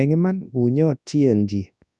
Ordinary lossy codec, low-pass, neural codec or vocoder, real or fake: none; 10.8 kHz; codec, 24 kHz, 0.9 kbps, WavTokenizer, large speech release; fake